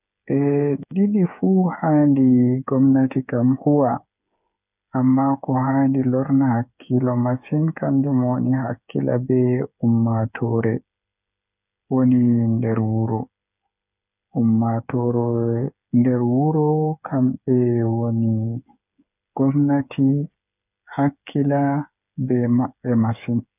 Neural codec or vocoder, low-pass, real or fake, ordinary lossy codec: codec, 16 kHz, 8 kbps, FreqCodec, smaller model; 3.6 kHz; fake; none